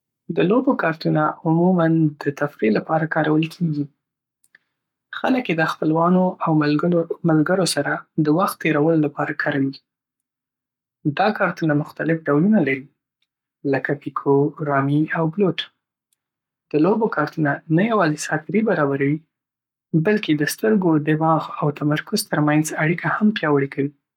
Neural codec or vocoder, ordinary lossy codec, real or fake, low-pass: codec, 44.1 kHz, 7.8 kbps, Pupu-Codec; none; fake; 19.8 kHz